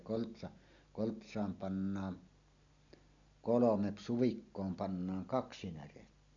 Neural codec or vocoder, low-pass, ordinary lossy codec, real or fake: none; 7.2 kHz; none; real